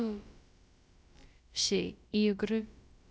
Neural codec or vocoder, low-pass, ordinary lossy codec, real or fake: codec, 16 kHz, about 1 kbps, DyCAST, with the encoder's durations; none; none; fake